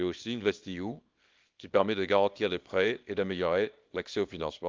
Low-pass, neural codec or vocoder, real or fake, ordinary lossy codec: 7.2 kHz; codec, 24 kHz, 0.9 kbps, WavTokenizer, small release; fake; Opus, 32 kbps